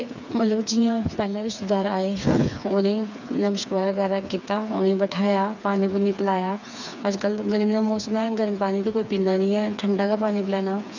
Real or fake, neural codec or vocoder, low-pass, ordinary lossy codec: fake; codec, 16 kHz, 4 kbps, FreqCodec, smaller model; 7.2 kHz; none